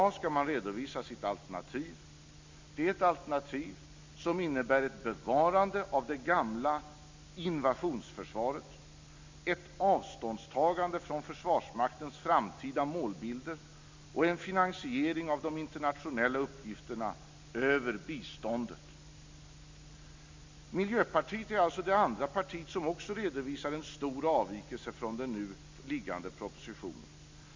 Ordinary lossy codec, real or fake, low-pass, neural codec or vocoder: MP3, 64 kbps; real; 7.2 kHz; none